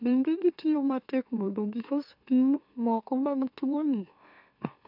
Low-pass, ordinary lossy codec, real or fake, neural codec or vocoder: 5.4 kHz; AAC, 48 kbps; fake; autoencoder, 44.1 kHz, a latent of 192 numbers a frame, MeloTTS